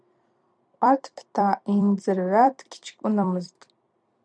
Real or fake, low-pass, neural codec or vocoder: fake; 9.9 kHz; vocoder, 44.1 kHz, 128 mel bands every 256 samples, BigVGAN v2